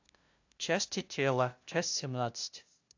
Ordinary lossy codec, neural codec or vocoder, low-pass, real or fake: AAC, 48 kbps; codec, 16 kHz, 0.5 kbps, FunCodec, trained on LibriTTS, 25 frames a second; 7.2 kHz; fake